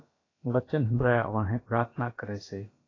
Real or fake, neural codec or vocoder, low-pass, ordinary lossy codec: fake; codec, 16 kHz, about 1 kbps, DyCAST, with the encoder's durations; 7.2 kHz; AAC, 32 kbps